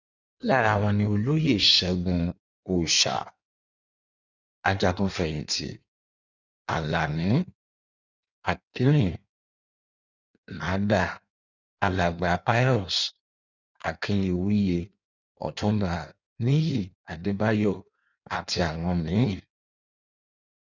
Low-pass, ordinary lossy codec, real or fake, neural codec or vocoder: 7.2 kHz; none; fake; codec, 16 kHz in and 24 kHz out, 1.1 kbps, FireRedTTS-2 codec